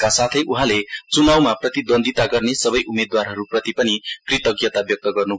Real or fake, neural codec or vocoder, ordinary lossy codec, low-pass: real; none; none; none